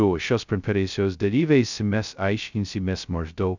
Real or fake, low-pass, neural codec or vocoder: fake; 7.2 kHz; codec, 16 kHz, 0.2 kbps, FocalCodec